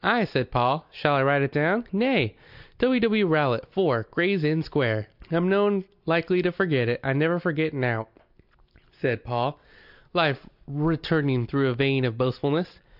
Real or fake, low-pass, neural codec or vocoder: real; 5.4 kHz; none